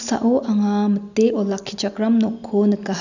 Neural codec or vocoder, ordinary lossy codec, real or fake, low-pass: none; none; real; 7.2 kHz